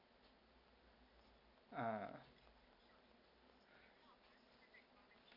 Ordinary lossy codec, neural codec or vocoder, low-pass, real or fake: none; none; 5.4 kHz; real